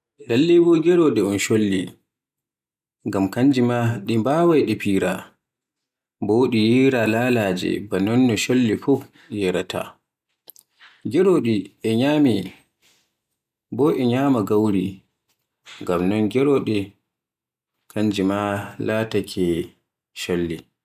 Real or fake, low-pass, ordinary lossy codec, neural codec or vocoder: real; 14.4 kHz; none; none